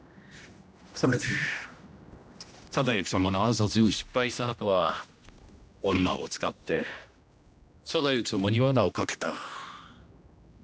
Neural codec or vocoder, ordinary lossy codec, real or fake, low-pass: codec, 16 kHz, 1 kbps, X-Codec, HuBERT features, trained on general audio; none; fake; none